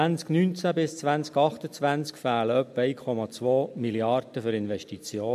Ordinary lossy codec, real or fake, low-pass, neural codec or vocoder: MP3, 64 kbps; real; 14.4 kHz; none